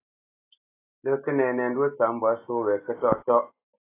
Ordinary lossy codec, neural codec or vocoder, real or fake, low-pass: AAC, 24 kbps; none; real; 3.6 kHz